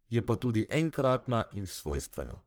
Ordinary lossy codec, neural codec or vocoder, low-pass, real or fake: none; codec, 44.1 kHz, 1.7 kbps, Pupu-Codec; none; fake